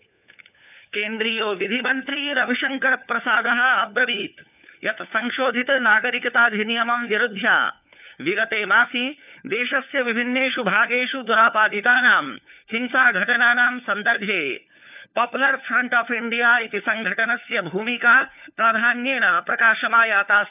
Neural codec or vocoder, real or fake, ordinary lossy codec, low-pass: codec, 16 kHz, 4 kbps, FunCodec, trained on LibriTTS, 50 frames a second; fake; none; 3.6 kHz